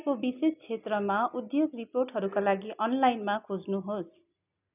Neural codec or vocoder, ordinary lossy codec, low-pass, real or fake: none; none; 3.6 kHz; real